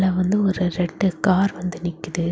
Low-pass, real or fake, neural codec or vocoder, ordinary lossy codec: none; real; none; none